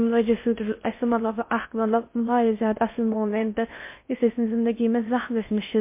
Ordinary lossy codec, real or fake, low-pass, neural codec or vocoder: MP3, 24 kbps; fake; 3.6 kHz; codec, 16 kHz in and 24 kHz out, 0.6 kbps, FocalCodec, streaming, 4096 codes